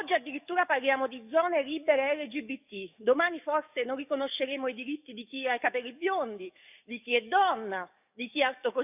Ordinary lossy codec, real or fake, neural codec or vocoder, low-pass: none; fake; codec, 44.1 kHz, 7.8 kbps, DAC; 3.6 kHz